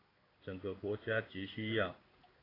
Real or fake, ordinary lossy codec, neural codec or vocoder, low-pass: fake; AAC, 24 kbps; codec, 16 kHz in and 24 kHz out, 1 kbps, XY-Tokenizer; 5.4 kHz